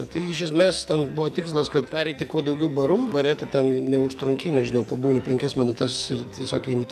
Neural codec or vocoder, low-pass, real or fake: codec, 44.1 kHz, 2.6 kbps, SNAC; 14.4 kHz; fake